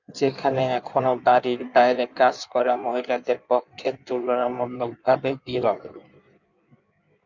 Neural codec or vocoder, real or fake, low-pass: codec, 16 kHz in and 24 kHz out, 1.1 kbps, FireRedTTS-2 codec; fake; 7.2 kHz